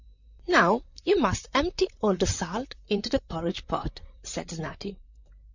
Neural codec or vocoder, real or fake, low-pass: vocoder, 44.1 kHz, 128 mel bands, Pupu-Vocoder; fake; 7.2 kHz